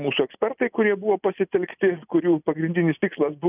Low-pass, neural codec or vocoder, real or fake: 3.6 kHz; none; real